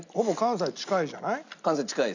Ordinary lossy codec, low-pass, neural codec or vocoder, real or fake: none; 7.2 kHz; none; real